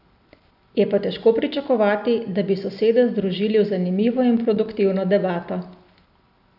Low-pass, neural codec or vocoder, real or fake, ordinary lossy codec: 5.4 kHz; none; real; none